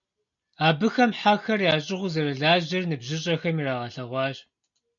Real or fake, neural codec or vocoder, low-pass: real; none; 7.2 kHz